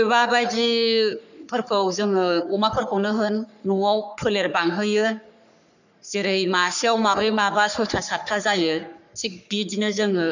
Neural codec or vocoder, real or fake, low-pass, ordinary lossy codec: codec, 44.1 kHz, 3.4 kbps, Pupu-Codec; fake; 7.2 kHz; none